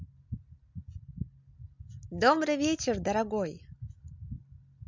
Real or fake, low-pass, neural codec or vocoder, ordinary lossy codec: real; 7.2 kHz; none; MP3, 64 kbps